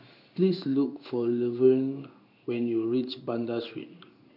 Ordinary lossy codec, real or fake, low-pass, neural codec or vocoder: none; fake; 5.4 kHz; codec, 16 kHz in and 24 kHz out, 1 kbps, XY-Tokenizer